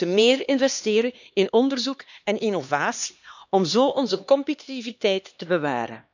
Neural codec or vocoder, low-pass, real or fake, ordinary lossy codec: codec, 16 kHz, 2 kbps, X-Codec, HuBERT features, trained on LibriSpeech; 7.2 kHz; fake; none